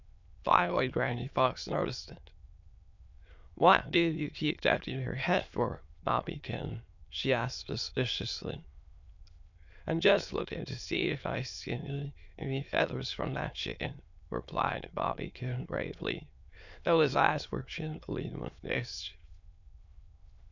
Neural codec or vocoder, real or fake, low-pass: autoencoder, 22.05 kHz, a latent of 192 numbers a frame, VITS, trained on many speakers; fake; 7.2 kHz